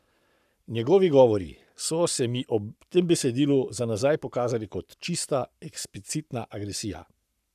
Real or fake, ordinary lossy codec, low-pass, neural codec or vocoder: fake; none; 14.4 kHz; codec, 44.1 kHz, 7.8 kbps, Pupu-Codec